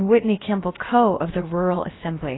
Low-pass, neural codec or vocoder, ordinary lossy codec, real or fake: 7.2 kHz; codec, 16 kHz, about 1 kbps, DyCAST, with the encoder's durations; AAC, 16 kbps; fake